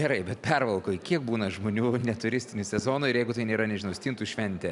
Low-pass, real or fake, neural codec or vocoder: 10.8 kHz; real; none